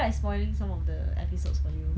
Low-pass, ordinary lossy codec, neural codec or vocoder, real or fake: none; none; none; real